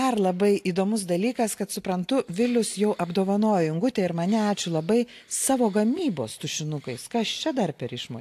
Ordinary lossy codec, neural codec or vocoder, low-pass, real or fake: AAC, 64 kbps; none; 14.4 kHz; real